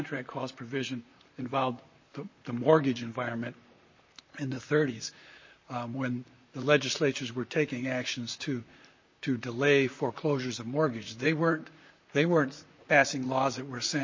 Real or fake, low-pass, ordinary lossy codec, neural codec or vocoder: fake; 7.2 kHz; MP3, 32 kbps; vocoder, 44.1 kHz, 128 mel bands, Pupu-Vocoder